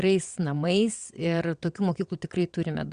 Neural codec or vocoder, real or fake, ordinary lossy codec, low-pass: vocoder, 22.05 kHz, 80 mel bands, WaveNeXt; fake; Opus, 64 kbps; 9.9 kHz